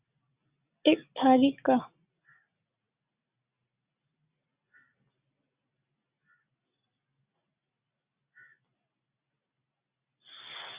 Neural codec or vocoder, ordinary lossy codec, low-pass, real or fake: none; Opus, 64 kbps; 3.6 kHz; real